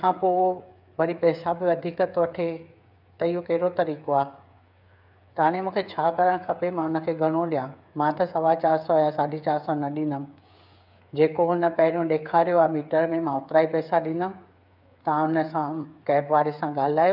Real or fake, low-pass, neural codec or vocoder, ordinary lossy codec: fake; 5.4 kHz; codec, 16 kHz, 8 kbps, FreqCodec, smaller model; none